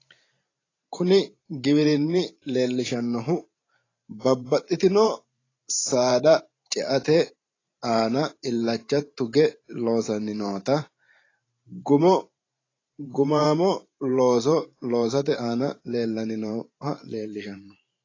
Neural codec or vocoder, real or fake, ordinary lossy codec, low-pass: vocoder, 44.1 kHz, 128 mel bands every 512 samples, BigVGAN v2; fake; AAC, 32 kbps; 7.2 kHz